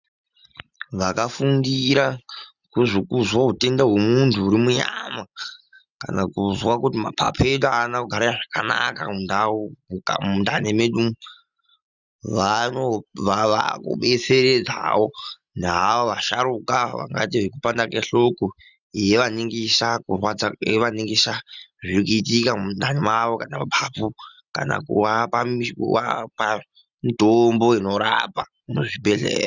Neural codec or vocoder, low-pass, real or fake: none; 7.2 kHz; real